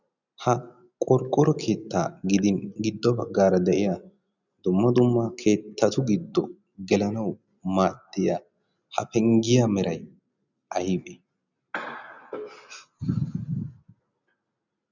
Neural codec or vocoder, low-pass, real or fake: none; 7.2 kHz; real